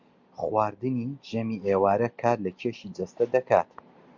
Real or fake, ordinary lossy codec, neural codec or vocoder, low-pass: real; Opus, 64 kbps; none; 7.2 kHz